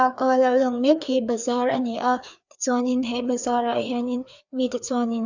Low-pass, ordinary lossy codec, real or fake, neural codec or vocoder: 7.2 kHz; none; fake; codec, 16 kHz, 2 kbps, FreqCodec, larger model